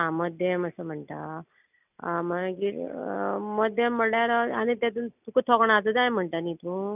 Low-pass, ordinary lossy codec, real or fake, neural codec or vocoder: 3.6 kHz; none; real; none